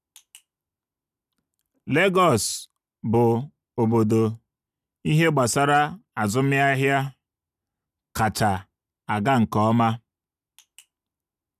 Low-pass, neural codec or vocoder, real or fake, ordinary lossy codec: 14.4 kHz; none; real; none